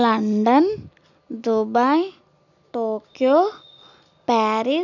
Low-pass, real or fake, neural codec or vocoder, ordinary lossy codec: 7.2 kHz; real; none; none